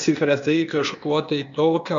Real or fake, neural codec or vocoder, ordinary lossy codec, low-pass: fake; codec, 16 kHz, 0.8 kbps, ZipCodec; MP3, 64 kbps; 7.2 kHz